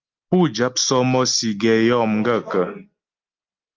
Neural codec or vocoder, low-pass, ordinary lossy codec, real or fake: none; 7.2 kHz; Opus, 32 kbps; real